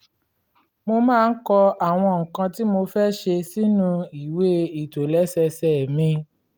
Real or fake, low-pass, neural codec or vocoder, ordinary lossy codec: real; 19.8 kHz; none; Opus, 32 kbps